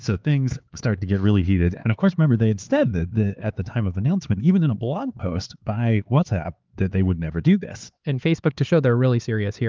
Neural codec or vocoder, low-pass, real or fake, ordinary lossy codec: codec, 16 kHz, 4 kbps, X-Codec, HuBERT features, trained on LibriSpeech; 7.2 kHz; fake; Opus, 32 kbps